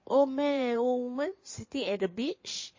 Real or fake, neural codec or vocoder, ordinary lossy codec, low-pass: fake; codec, 16 kHz in and 24 kHz out, 2.2 kbps, FireRedTTS-2 codec; MP3, 32 kbps; 7.2 kHz